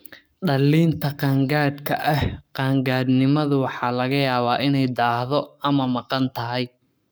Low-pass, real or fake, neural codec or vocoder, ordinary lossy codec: none; fake; codec, 44.1 kHz, 7.8 kbps, Pupu-Codec; none